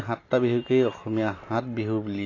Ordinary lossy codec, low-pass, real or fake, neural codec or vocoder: AAC, 32 kbps; 7.2 kHz; real; none